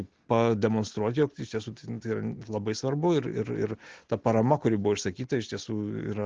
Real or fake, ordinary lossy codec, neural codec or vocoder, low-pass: real; Opus, 16 kbps; none; 7.2 kHz